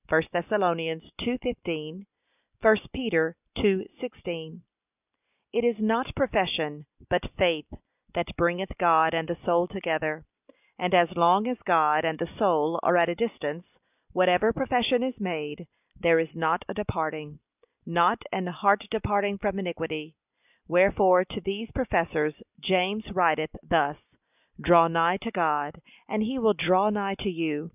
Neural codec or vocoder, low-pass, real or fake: none; 3.6 kHz; real